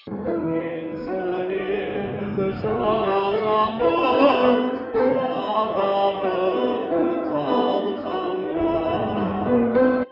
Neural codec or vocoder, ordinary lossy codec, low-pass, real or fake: codec, 16 kHz in and 24 kHz out, 2.2 kbps, FireRedTTS-2 codec; none; 5.4 kHz; fake